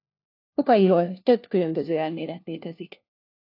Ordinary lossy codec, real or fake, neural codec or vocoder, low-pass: AAC, 32 kbps; fake; codec, 16 kHz, 1 kbps, FunCodec, trained on LibriTTS, 50 frames a second; 5.4 kHz